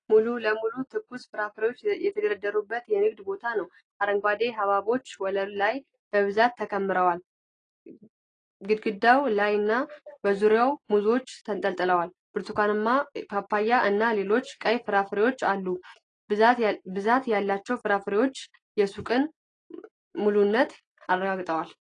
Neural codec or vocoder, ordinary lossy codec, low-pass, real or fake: none; AAC, 32 kbps; 9.9 kHz; real